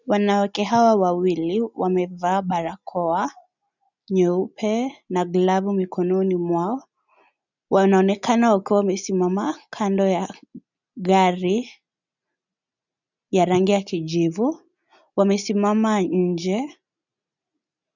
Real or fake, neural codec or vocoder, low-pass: real; none; 7.2 kHz